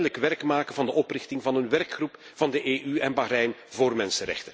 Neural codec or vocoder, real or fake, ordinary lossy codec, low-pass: none; real; none; none